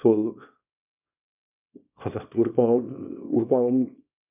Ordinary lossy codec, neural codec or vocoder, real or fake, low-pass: none; codec, 24 kHz, 0.9 kbps, WavTokenizer, small release; fake; 3.6 kHz